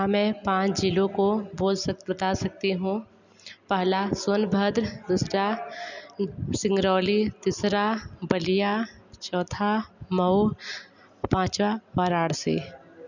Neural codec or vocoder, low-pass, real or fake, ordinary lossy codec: none; 7.2 kHz; real; none